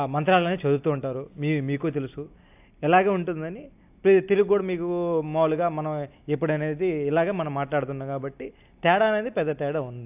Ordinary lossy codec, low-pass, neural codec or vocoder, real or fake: AAC, 32 kbps; 3.6 kHz; none; real